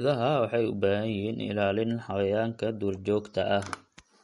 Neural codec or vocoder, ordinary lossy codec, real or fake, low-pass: none; MP3, 48 kbps; real; 19.8 kHz